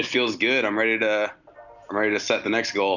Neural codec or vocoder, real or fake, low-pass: none; real; 7.2 kHz